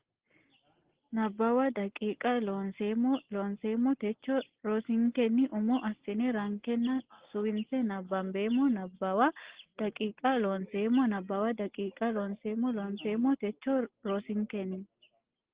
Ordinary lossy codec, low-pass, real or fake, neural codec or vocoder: Opus, 16 kbps; 3.6 kHz; real; none